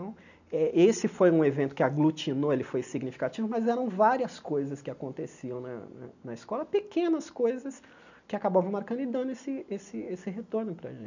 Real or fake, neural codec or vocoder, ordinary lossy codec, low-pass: real; none; none; 7.2 kHz